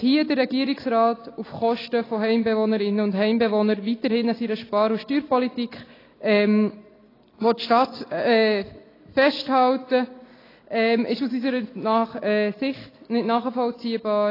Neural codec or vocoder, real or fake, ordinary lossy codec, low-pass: none; real; AAC, 24 kbps; 5.4 kHz